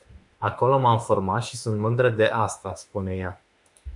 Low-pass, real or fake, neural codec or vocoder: 10.8 kHz; fake; autoencoder, 48 kHz, 32 numbers a frame, DAC-VAE, trained on Japanese speech